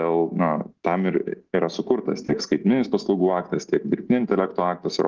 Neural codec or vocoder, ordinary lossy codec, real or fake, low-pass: none; Opus, 16 kbps; real; 7.2 kHz